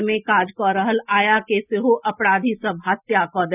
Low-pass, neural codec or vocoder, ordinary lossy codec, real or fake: 3.6 kHz; none; none; real